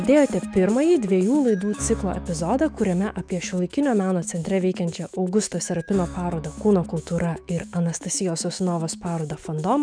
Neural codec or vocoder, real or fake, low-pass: autoencoder, 48 kHz, 128 numbers a frame, DAC-VAE, trained on Japanese speech; fake; 9.9 kHz